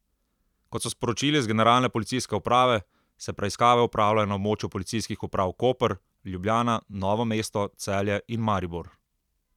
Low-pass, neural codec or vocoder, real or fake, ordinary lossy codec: 19.8 kHz; vocoder, 44.1 kHz, 128 mel bands every 256 samples, BigVGAN v2; fake; none